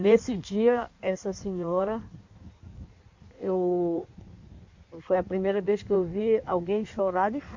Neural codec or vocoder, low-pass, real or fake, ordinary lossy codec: codec, 16 kHz in and 24 kHz out, 1.1 kbps, FireRedTTS-2 codec; 7.2 kHz; fake; MP3, 48 kbps